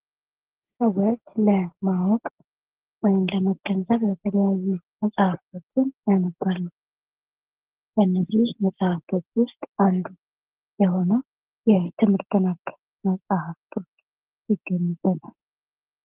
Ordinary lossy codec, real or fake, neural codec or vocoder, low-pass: Opus, 16 kbps; real; none; 3.6 kHz